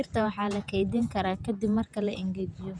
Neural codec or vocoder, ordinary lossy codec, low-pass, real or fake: vocoder, 48 kHz, 128 mel bands, Vocos; none; 9.9 kHz; fake